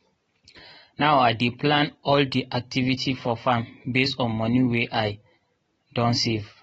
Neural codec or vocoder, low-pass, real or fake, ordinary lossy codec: none; 19.8 kHz; real; AAC, 24 kbps